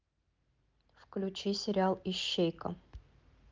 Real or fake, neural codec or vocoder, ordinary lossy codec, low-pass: real; none; Opus, 32 kbps; 7.2 kHz